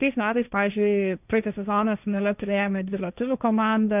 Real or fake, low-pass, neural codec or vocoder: fake; 3.6 kHz; codec, 16 kHz, 1.1 kbps, Voila-Tokenizer